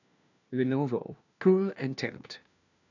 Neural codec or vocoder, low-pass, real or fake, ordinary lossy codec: codec, 16 kHz, 1 kbps, FunCodec, trained on LibriTTS, 50 frames a second; 7.2 kHz; fake; none